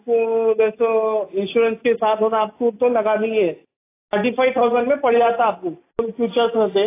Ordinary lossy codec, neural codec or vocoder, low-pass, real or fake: AAC, 24 kbps; none; 3.6 kHz; real